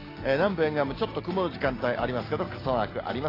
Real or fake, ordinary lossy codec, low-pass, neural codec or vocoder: real; AAC, 24 kbps; 5.4 kHz; none